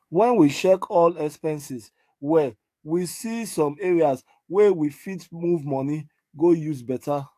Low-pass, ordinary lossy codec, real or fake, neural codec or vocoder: 14.4 kHz; AAC, 64 kbps; fake; codec, 44.1 kHz, 7.8 kbps, DAC